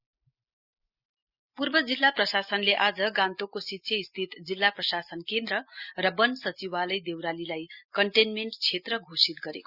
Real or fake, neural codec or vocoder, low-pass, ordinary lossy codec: real; none; 5.4 kHz; Opus, 64 kbps